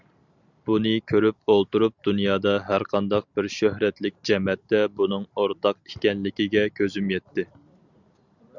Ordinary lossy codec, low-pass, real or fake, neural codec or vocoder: Opus, 64 kbps; 7.2 kHz; real; none